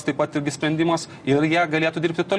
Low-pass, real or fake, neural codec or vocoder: 9.9 kHz; real; none